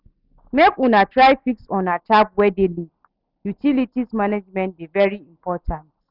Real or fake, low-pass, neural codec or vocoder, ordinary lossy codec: real; 5.4 kHz; none; none